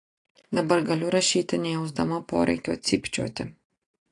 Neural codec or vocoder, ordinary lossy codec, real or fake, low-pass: none; AAC, 48 kbps; real; 10.8 kHz